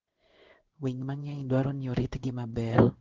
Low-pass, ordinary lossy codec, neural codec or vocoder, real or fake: 7.2 kHz; Opus, 16 kbps; codec, 16 kHz in and 24 kHz out, 1 kbps, XY-Tokenizer; fake